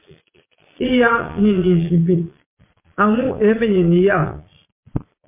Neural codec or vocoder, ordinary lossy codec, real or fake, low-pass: vocoder, 22.05 kHz, 80 mel bands, Vocos; MP3, 24 kbps; fake; 3.6 kHz